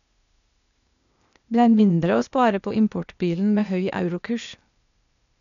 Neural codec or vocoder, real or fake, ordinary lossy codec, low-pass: codec, 16 kHz, 0.8 kbps, ZipCodec; fake; none; 7.2 kHz